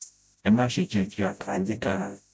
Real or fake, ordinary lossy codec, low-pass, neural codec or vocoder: fake; none; none; codec, 16 kHz, 0.5 kbps, FreqCodec, smaller model